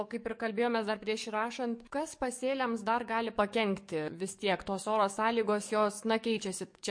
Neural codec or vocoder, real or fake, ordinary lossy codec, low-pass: codec, 44.1 kHz, 7.8 kbps, DAC; fake; MP3, 48 kbps; 9.9 kHz